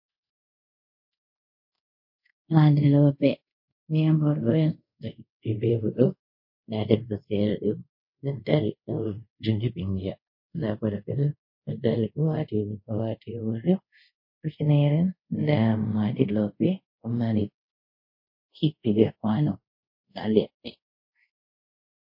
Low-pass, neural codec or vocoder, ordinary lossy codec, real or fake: 5.4 kHz; codec, 24 kHz, 0.5 kbps, DualCodec; MP3, 32 kbps; fake